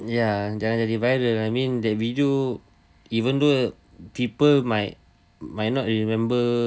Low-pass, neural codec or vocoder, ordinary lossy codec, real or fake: none; none; none; real